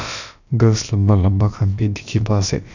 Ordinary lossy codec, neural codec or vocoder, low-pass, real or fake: none; codec, 16 kHz, about 1 kbps, DyCAST, with the encoder's durations; 7.2 kHz; fake